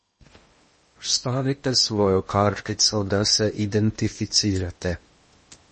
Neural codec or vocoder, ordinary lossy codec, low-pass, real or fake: codec, 16 kHz in and 24 kHz out, 0.8 kbps, FocalCodec, streaming, 65536 codes; MP3, 32 kbps; 10.8 kHz; fake